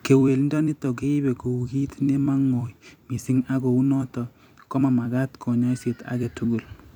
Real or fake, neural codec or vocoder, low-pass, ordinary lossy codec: fake; vocoder, 44.1 kHz, 128 mel bands every 256 samples, BigVGAN v2; 19.8 kHz; none